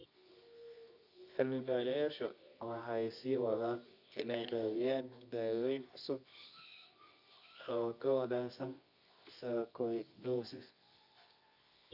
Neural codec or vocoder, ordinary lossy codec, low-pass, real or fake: codec, 24 kHz, 0.9 kbps, WavTokenizer, medium music audio release; Opus, 64 kbps; 5.4 kHz; fake